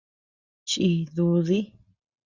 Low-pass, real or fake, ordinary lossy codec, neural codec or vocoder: 7.2 kHz; real; Opus, 64 kbps; none